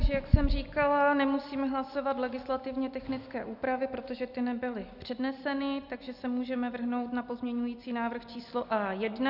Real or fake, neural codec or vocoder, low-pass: real; none; 5.4 kHz